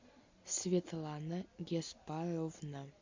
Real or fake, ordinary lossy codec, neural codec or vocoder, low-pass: real; MP3, 64 kbps; none; 7.2 kHz